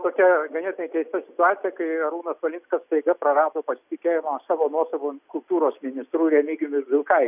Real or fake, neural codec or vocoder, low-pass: real; none; 3.6 kHz